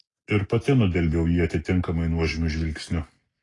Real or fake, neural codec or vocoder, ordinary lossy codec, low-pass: real; none; AAC, 32 kbps; 10.8 kHz